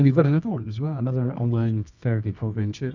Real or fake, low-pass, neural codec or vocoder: fake; 7.2 kHz; codec, 24 kHz, 0.9 kbps, WavTokenizer, medium music audio release